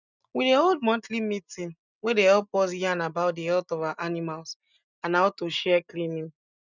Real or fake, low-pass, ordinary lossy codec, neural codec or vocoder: real; 7.2 kHz; none; none